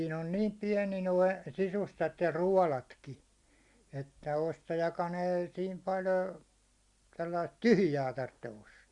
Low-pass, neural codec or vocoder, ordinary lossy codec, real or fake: 10.8 kHz; none; Opus, 64 kbps; real